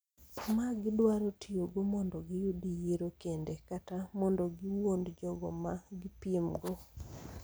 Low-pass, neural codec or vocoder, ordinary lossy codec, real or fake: none; none; none; real